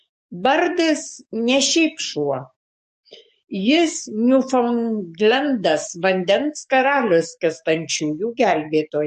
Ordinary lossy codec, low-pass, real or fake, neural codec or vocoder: MP3, 48 kbps; 14.4 kHz; fake; codec, 44.1 kHz, 7.8 kbps, DAC